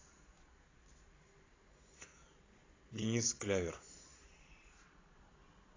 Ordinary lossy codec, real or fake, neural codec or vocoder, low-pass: AAC, 32 kbps; fake; vocoder, 22.05 kHz, 80 mel bands, Vocos; 7.2 kHz